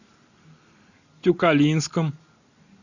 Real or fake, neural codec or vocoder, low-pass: real; none; 7.2 kHz